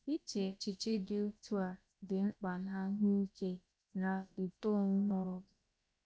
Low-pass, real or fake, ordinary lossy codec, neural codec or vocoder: none; fake; none; codec, 16 kHz, about 1 kbps, DyCAST, with the encoder's durations